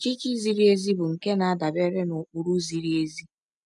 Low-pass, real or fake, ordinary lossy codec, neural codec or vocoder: 10.8 kHz; real; none; none